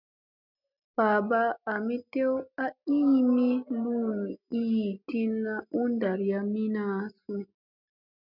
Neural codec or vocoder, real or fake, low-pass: none; real; 5.4 kHz